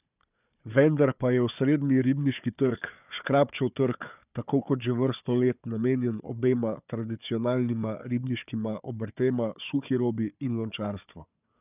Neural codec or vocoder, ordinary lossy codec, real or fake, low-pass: codec, 16 kHz, 4 kbps, FunCodec, trained on Chinese and English, 50 frames a second; none; fake; 3.6 kHz